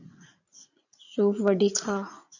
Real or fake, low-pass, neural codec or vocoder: fake; 7.2 kHz; vocoder, 44.1 kHz, 80 mel bands, Vocos